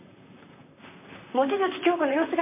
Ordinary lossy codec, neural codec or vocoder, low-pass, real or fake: MP3, 24 kbps; vocoder, 44.1 kHz, 128 mel bands, Pupu-Vocoder; 3.6 kHz; fake